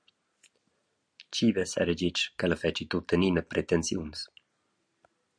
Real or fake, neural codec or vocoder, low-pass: real; none; 9.9 kHz